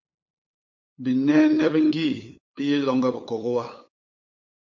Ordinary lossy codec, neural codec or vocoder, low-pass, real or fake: MP3, 48 kbps; codec, 16 kHz, 8 kbps, FunCodec, trained on LibriTTS, 25 frames a second; 7.2 kHz; fake